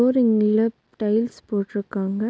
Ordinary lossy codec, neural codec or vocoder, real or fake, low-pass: none; none; real; none